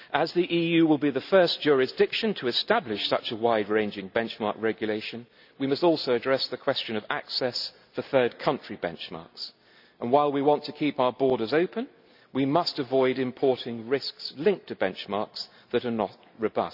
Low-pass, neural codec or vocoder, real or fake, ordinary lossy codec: 5.4 kHz; none; real; none